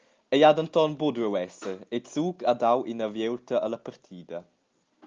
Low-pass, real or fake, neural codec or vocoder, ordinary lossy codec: 7.2 kHz; real; none; Opus, 24 kbps